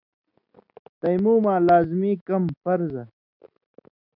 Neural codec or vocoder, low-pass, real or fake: none; 5.4 kHz; real